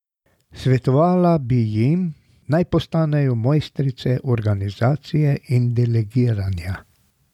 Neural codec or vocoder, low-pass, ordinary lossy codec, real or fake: none; 19.8 kHz; none; real